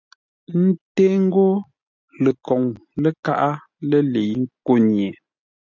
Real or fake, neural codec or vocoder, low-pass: real; none; 7.2 kHz